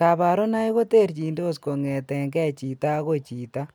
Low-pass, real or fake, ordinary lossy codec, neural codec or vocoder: none; real; none; none